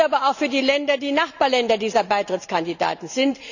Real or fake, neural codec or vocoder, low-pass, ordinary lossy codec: real; none; 7.2 kHz; none